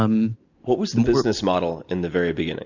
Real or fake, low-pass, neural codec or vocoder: real; 7.2 kHz; none